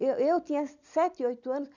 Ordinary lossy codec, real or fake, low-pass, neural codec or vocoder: none; real; 7.2 kHz; none